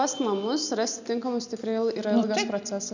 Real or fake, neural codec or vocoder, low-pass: real; none; 7.2 kHz